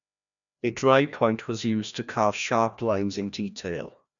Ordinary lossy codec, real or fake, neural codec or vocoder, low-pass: none; fake; codec, 16 kHz, 1 kbps, FreqCodec, larger model; 7.2 kHz